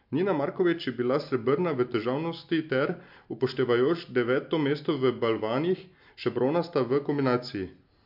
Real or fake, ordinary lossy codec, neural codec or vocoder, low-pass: real; MP3, 48 kbps; none; 5.4 kHz